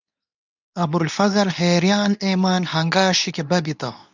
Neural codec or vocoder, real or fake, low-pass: codec, 24 kHz, 0.9 kbps, WavTokenizer, medium speech release version 2; fake; 7.2 kHz